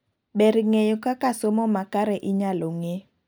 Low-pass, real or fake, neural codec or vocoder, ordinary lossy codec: none; real; none; none